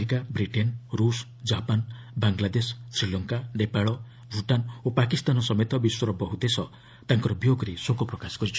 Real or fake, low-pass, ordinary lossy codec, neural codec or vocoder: real; none; none; none